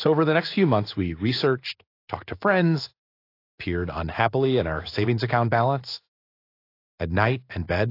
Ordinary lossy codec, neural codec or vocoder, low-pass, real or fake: AAC, 32 kbps; codec, 16 kHz in and 24 kHz out, 1 kbps, XY-Tokenizer; 5.4 kHz; fake